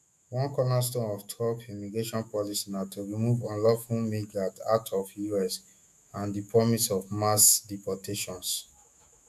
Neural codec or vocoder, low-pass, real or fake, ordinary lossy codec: autoencoder, 48 kHz, 128 numbers a frame, DAC-VAE, trained on Japanese speech; 14.4 kHz; fake; none